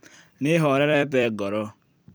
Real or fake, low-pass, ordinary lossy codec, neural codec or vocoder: fake; none; none; vocoder, 44.1 kHz, 128 mel bands every 256 samples, BigVGAN v2